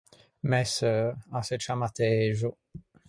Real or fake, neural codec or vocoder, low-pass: real; none; 9.9 kHz